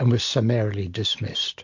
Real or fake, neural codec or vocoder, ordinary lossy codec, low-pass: real; none; MP3, 48 kbps; 7.2 kHz